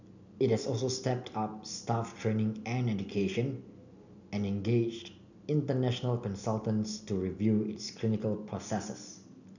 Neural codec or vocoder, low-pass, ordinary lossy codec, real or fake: none; 7.2 kHz; none; real